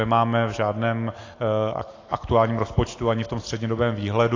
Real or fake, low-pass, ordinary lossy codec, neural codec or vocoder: real; 7.2 kHz; AAC, 32 kbps; none